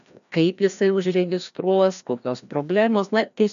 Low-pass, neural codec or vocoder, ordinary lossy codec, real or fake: 7.2 kHz; codec, 16 kHz, 1 kbps, FreqCodec, larger model; AAC, 96 kbps; fake